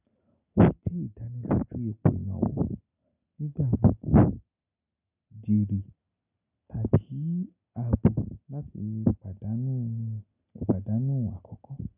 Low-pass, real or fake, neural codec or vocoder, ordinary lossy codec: 3.6 kHz; real; none; none